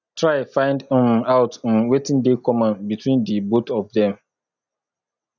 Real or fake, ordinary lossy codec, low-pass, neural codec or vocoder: real; none; 7.2 kHz; none